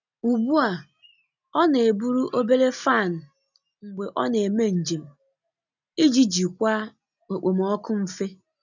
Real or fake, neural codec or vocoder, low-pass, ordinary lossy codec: real; none; 7.2 kHz; none